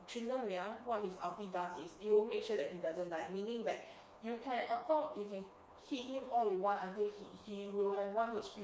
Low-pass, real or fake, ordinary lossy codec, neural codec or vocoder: none; fake; none; codec, 16 kHz, 2 kbps, FreqCodec, smaller model